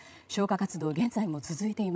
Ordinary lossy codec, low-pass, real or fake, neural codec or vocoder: none; none; fake; codec, 16 kHz, 16 kbps, FreqCodec, larger model